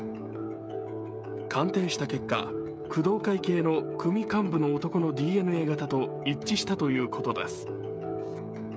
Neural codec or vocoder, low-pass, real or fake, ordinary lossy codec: codec, 16 kHz, 16 kbps, FreqCodec, smaller model; none; fake; none